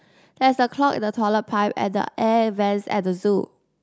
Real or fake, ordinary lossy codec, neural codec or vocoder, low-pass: real; none; none; none